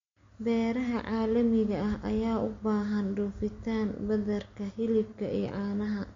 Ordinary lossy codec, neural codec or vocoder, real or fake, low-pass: MP3, 48 kbps; none; real; 7.2 kHz